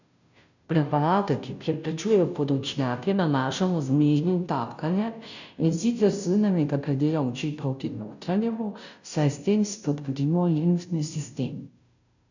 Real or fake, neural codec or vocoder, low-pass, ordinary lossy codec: fake; codec, 16 kHz, 0.5 kbps, FunCodec, trained on Chinese and English, 25 frames a second; 7.2 kHz; none